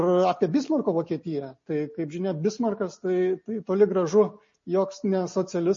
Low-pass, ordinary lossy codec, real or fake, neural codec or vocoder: 7.2 kHz; MP3, 32 kbps; real; none